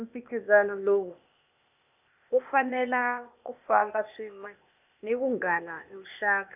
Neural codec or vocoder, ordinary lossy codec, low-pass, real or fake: codec, 16 kHz, 0.8 kbps, ZipCodec; none; 3.6 kHz; fake